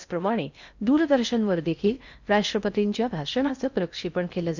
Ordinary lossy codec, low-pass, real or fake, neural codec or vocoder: none; 7.2 kHz; fake; codec, 16 kHz in and 24 kHz out, 0.6 kbps, FocalCodec, streaming, 4096 codes